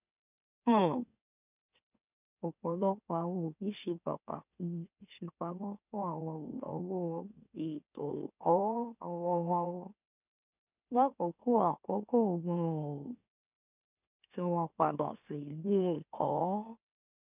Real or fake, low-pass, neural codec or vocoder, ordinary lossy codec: fake; 3.6 kHz; autoencoder, 44.1 kHz, a latent of 192 numbers a frame, MeloTTS; none